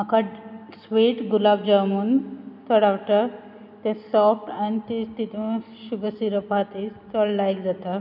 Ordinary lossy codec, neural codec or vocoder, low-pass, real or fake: none; none; 5.4 kHz; real